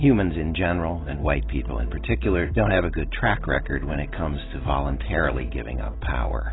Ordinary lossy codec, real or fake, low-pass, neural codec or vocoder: AAC, 16 kbps; real; 7.2 kHz; none